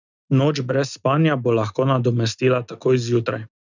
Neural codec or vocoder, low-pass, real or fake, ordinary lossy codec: none; 7.2 kHz; real; none